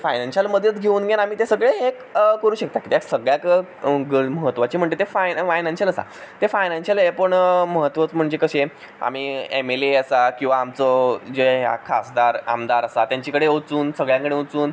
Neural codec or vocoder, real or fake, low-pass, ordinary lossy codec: none; real; none; none